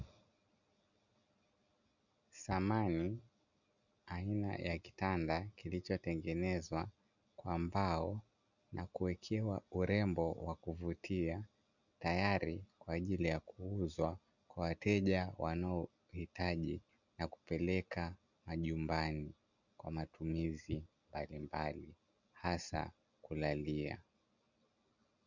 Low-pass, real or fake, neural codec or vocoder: 7.2 kHz; real; none